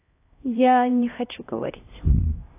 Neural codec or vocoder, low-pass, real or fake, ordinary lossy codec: codec, 16 kHz, 1 kbps, X-Codec, HuBERT features, trained on LibriSpeech; 3.6 kHz; fake; AAC, 24 kbps